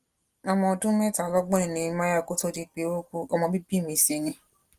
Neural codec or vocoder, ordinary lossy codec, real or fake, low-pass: none; Opus, 24 kbps; real; 14.4 kHz